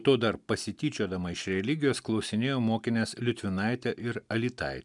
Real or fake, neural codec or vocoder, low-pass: real; none; 10.8 kHz